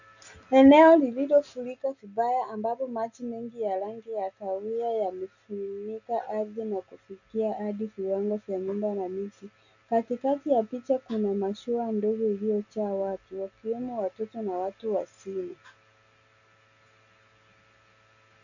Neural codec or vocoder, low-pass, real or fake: none; 7.2 kHz; real